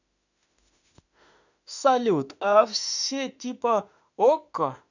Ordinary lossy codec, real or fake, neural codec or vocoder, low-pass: none; fake; autoencoder, 48 kHz, 32 numbers a frame, DAC-VAE, trained on Japanese speech; 7.2 kHz